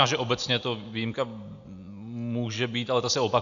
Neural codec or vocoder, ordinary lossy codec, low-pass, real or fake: none; AAC, 64 kbps; 7.2 kHz; real